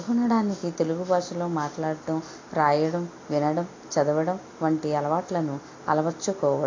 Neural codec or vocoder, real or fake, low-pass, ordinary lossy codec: none; real; 7.2 kHz; AAC, 32 kbps